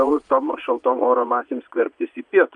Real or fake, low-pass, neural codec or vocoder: fake; 9.9 kHz; vocoder, 22.05 kHz, 80 mel bands, Vocos